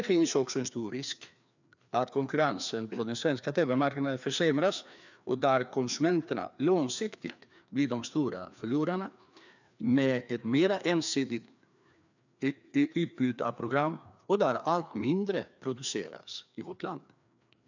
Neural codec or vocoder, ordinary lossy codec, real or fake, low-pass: codec, 16 kHz, 2 kbps, FreqCodec, larger model; none; fake; 7.2 kHz